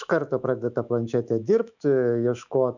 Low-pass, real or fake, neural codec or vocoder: 7.2 kHz; fake; autoencoder, 48 kHz, 128 numbers a frame, DAC-VAE, trained on Japanese speech